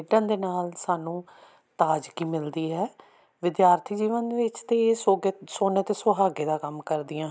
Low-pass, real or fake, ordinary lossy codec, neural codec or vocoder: none; real; none; none